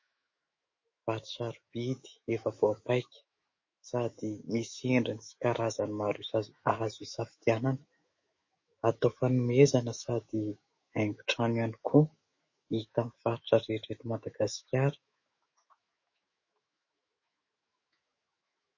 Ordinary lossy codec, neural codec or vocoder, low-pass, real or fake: MP3, 32 kbps; autoencoder, 48 kHz, 128 numbers a frame, DAC-VAE, trained on Japanese speech; 7.2 kHz; fake